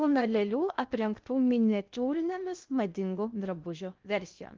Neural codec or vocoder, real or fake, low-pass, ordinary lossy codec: codec, 16 kHz in and 24 kHz out, 0.6 kbps, FocalCodec, streaming, 2048 codes; fake; 7.2 kHz; Opus, 32 kbps